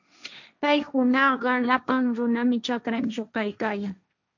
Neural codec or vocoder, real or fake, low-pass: codec, 16 kHz, 1.1 kbps, Voila-Tokenizer; fake; 7.2 kHz